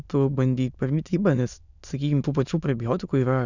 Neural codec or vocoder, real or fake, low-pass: autoencoder, 22.05 kHz, a latent of 192 numbers a frame, VITS, trained on many speakers; fake; 7.2 kHz